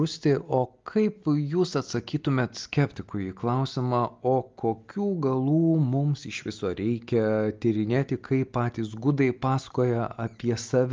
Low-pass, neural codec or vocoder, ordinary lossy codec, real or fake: 7.2 kHz; none; Opus, 32 kbps; real